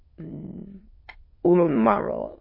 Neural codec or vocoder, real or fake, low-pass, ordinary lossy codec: autoencoder, 22.05 kHz, a latent of 192 numbers a frame, VITS, trained on many speakers; fake; 5.4 kHz; MP3, 24 kbps